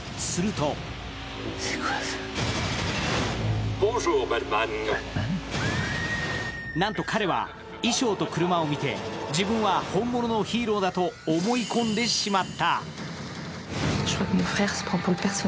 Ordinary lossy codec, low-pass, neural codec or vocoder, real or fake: none; none; none; real